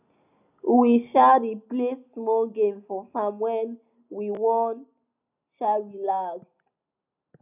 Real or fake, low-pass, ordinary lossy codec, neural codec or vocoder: real; 3.6 kHz; none; none